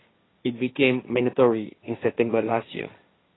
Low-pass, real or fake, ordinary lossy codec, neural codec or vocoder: 7.2 kHz; fake; AAC, 16 kbps; codec, 16 kHz, 1.1 kbps, Voila-Tokenizer